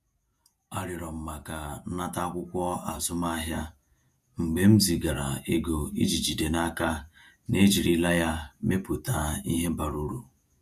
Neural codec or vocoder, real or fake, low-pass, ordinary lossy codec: vocoder, 48 kHz, 128 mel bands, Vocos; fake; 14.4 kHz; none